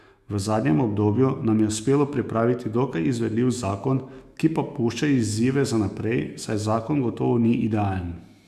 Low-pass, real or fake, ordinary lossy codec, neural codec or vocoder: 14.4 kHz; fake; Opus, 64 kbps; autoencoder, 48 kHz, 128 numbers a frame, DAC-VAE, trained on Japanese speech